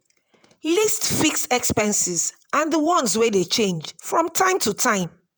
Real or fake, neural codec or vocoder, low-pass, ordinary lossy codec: fake; vocoder, 48 kHz, 128 mel bands, Vocos; none; none